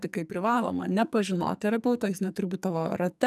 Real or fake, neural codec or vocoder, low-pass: fake; codec, 44.1 kHz, 2.6 kbps, SNAC; 14.4 kHz